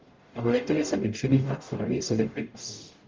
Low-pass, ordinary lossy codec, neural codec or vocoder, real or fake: 7.2 kHz; Opus, 32 kbps; codec, 44.1 kHz, 0.9 kbps, DAC; fake